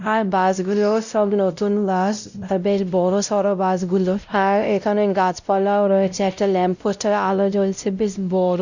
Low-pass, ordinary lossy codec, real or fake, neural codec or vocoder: 7.2 kHz; none; fake; codec, 16 kHz, 0.5 kbps, X-Codec, WavLM features, trained on Multilingual LibriSpeech